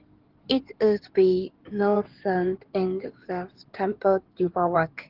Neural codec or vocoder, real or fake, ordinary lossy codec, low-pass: codec, 16 kHz in and 24 kHz out, 2.2 kbps, FireRedTTS-2 codec; fake; Opus, 16 kbps; 5.4 kHz